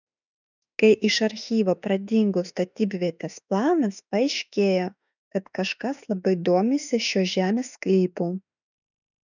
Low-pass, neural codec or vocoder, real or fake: 7.2 kHz; autoencoder, 48 kHz, 32 numbers a frame, DAC-VAE, trained on Japanese speech; fake